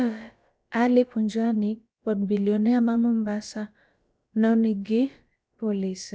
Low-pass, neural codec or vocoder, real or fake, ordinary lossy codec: none; codec, 16 kHz, about 1 kbps, DyCAST, with the encoder's durations; fake; none